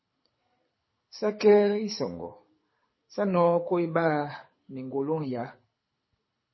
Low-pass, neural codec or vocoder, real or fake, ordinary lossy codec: 7.2 kHz; codec, 24 kHz, 6 kbps, HILCodec; fake; MP3, 24 kbps